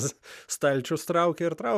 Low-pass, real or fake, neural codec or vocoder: 14.4 kHz; real; none